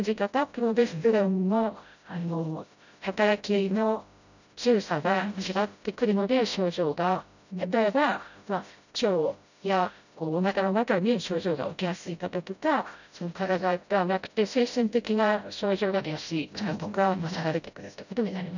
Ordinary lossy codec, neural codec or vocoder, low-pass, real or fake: none; codec, 16 kHz, 0.5 kbps, FreqCodec, smaller model; 7.2 kHz; fake